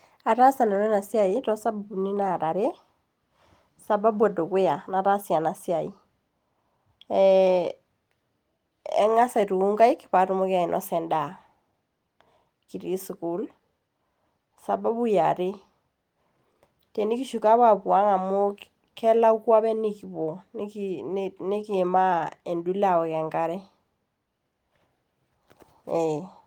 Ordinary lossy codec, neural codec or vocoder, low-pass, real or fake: Opus, 24 kbps; none; 19.8 kHz; real